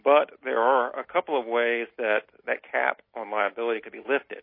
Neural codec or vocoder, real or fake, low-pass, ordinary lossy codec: none; real; 5.4 kHz; MP3, 24 kbps